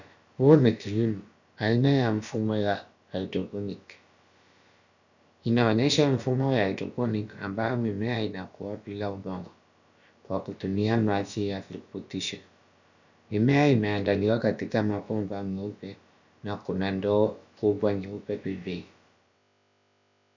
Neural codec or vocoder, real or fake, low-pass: codec, 16 kHz, about 1 kbps, DyCAST, with the encoder's durations; fake; 7.2 kHz